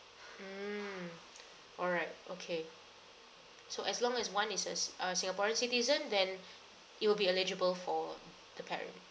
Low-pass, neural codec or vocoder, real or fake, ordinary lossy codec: none; none; real; none